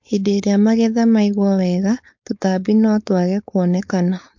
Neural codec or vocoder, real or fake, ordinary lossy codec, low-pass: codec, 16 kHz, 4.8 kbps, FACodec; fake; MP3, 48 kbps; 7.2 kHz